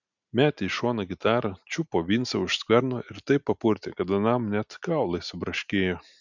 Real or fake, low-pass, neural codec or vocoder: real; 7.2 kHz; none